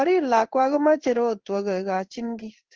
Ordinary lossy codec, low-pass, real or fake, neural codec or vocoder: Opus, 16 kbps; 7.2 kHz; fake; codec, 16 kHz in and 24 kHz out, 1 kbps, XY-Tokenizer